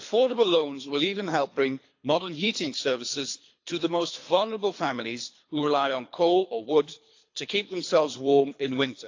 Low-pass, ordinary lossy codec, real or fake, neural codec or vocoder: 7.2 kHz; AAC, 48 kbps; fake; codec, 24 kHz, 3 kbps, HILCodec